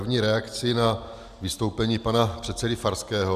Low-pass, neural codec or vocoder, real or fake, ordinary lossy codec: 14.4 kHz; none; real; AAC, 96 kbps